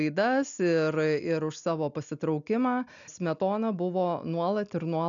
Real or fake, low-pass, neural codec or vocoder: real; 7.2 kHz; none